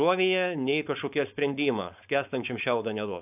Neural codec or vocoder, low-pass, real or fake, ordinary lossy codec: codec, 16 kHz, 4.8 kbps, FACodec; 3.6 kHz; fake; AAC, 32 kbps